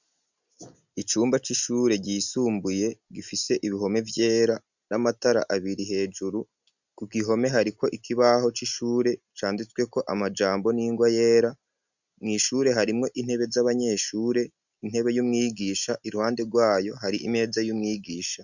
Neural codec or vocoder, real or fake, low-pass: none; real; 7.2 kHz